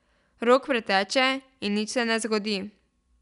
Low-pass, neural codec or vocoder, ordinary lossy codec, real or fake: 10.8 kHz; none; none; real